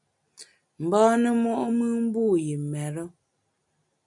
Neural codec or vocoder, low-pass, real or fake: none; 10.8 kHz; real